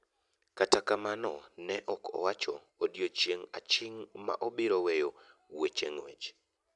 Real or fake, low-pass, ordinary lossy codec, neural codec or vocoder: real; 10.8 kHz; none; none